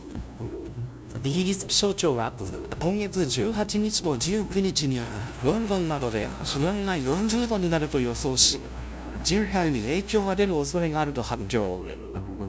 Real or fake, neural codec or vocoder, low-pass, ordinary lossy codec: fake; codec, 16 kHz, 0.5 kbps, FunCodec, trained on LibriTTS, 25 frames a second; none; none